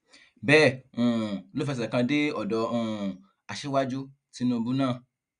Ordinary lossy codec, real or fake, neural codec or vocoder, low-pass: Opus, 64 kbps; real; none; 9.9 kHz